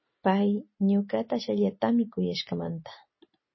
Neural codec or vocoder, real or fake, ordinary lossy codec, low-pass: none; real; MP3, 24 kbps; 7.2 kHz